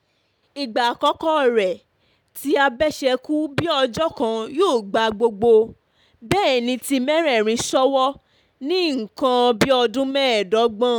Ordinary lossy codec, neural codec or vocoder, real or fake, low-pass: none; none; real; 19.8 kHz